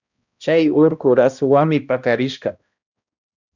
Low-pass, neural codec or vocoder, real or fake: 7.2 kHz; codec, 16 kHz, 1 kbps, X-Codec, HuBERT features, trained on balanced general audio; fake